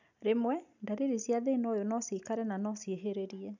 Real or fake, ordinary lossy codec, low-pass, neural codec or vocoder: real; none; 7.2 kHz; none